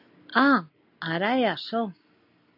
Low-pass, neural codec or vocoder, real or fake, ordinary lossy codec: 5.4 kHz; none; real; AAC, 48 kbps